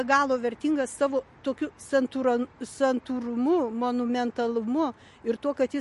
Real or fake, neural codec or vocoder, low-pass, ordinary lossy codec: real; none; 14.4 kHz; MP3, 48 kbps